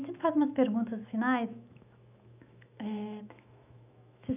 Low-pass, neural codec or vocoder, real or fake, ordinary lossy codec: 3.6 kHz; autoencoder, 48 kHz, 128 numbers a frame, DAC-VAE, trained on Japanese speech; fake; none